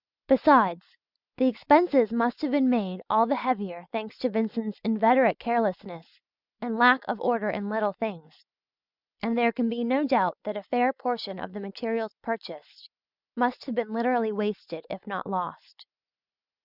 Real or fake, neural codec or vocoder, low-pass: real; none; 5.4 kHz